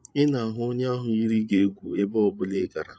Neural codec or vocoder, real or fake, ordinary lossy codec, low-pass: codec, 16 kHz, 8 kbps, FreqCodec, larger model; fake; none; none